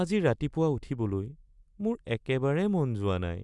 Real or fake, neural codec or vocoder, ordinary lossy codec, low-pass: real; none; Opus, 64 kbps; 10.8 kHz